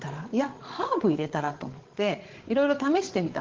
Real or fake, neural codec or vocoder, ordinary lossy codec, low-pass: fake; codec, 16 kHz, 8 kbps, FreqCodec, larger model; Opus, 16 kbps; 7.2 kHz